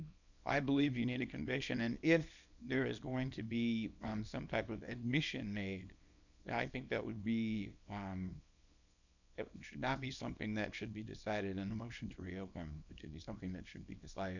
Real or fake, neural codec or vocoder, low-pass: fake; codec, 24 kHz, 0.9 kbps, WavTokenizer, small release; 7.2 kHz